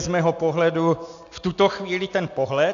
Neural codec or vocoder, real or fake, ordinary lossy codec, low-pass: none; real; AAC, 64 kbps; 7.2 kHz